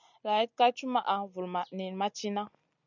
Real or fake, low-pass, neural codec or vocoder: real; 7.2 kHz; none